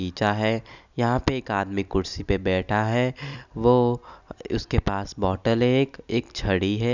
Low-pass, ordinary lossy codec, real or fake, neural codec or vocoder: 7.2 kHz; none; real; none